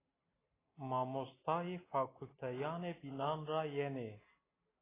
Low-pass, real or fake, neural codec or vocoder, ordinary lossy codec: 3.6 kHz; real; none; AAC, 16 kbps